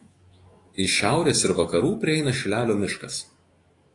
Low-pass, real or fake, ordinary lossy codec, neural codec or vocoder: 10.8 kHz; fake; AAC, 32 kbps; autoencoder, 48 kHz, 128 numbers a frame, DAC-VAE, trained on Japanese speech